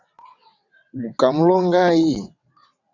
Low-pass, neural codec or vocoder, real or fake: 7.2 kHz; vocoder, 22.05 kHz, 80 mel bands, WaveNeXt; fake